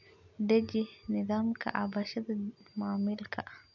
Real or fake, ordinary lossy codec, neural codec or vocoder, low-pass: real; none; none; 7.2 kHz